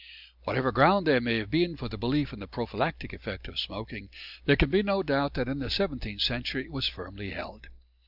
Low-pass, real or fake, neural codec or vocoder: 5.4 kHz; real; none